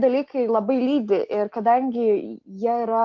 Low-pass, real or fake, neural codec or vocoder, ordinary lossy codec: 7.2 kHz; real; none; MP3, 64 kbps